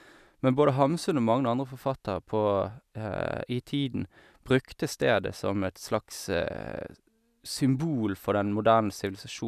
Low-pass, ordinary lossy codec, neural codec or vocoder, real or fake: 14.4 kHz; none; none; real